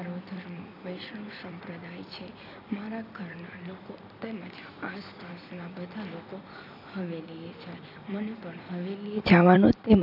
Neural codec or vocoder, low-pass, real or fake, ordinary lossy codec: vocoder, 44.1 kHz, 128 mel bands every 256 samples, BigVGAN v2; 5.4 kHz; fake; AAC, 48 kbps